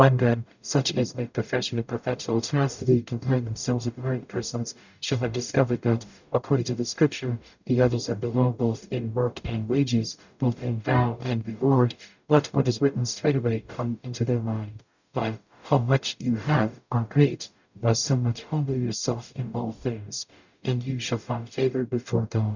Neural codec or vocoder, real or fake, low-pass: codec, 44.1 kHz, 0.9 kbps, DAC; fake; 7.2 kHz